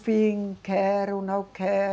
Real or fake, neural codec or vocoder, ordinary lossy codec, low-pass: real; none; none; none